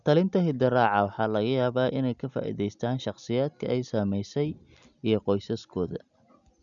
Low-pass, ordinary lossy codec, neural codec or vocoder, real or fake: 7.2 kHz; none; none; real